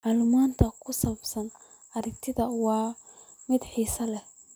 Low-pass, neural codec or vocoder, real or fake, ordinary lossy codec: none; none; real; none